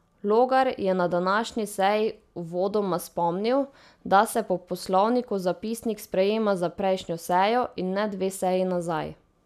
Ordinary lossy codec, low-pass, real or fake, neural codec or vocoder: none; 14.4 kHz; real; none